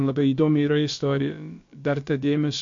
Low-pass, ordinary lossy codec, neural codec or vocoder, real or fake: 7.2 kHz; MP3, 48 kbps; codec, 16 kHz, about 1 kbps, DyCAST, with the encoder's durations; fake